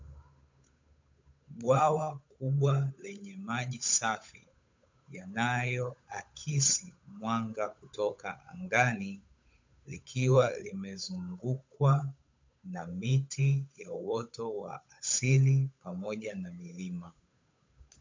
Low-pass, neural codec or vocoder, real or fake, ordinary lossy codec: 7.2 kHz; codec, 16 kHz, 8 kbps, FunCodec, trained on Chinese and English, 25 frames a second; fake; MP3, 64 kbps